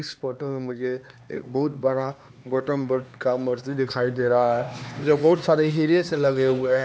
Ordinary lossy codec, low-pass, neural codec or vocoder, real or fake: none; none; codec, 16 kHz, 2 kbps, X-Codec, HuBERT features, trained on LibriSpeech; fake